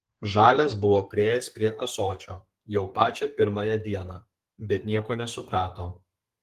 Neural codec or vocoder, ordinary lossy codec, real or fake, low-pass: codec, 32 kHz, 1.9 kbps, SNAC; Opus, 24 kbps; fake; 14.4 kHz